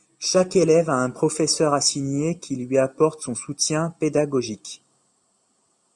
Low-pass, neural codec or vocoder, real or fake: 10.8 kHz; none; real